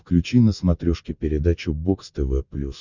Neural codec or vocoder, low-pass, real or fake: none; 7.2 kHz; real